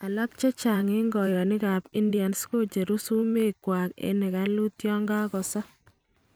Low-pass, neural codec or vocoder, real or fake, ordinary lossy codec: none; vocoder, 44.1 kHz, 128 mel bands every 512 samples, BigVGAN v2; fake; none